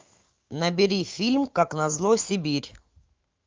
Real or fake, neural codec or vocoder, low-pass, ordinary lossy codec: fake; autoencoder, 48 kHz, 128 numbers a frame, DAC-VAE, trained on Japanese speech; 7.2 kHz; Opus, 32 kbps